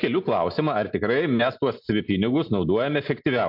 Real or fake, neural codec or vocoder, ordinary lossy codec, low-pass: real; none; MP3, 48 kbps; 5.4 kHz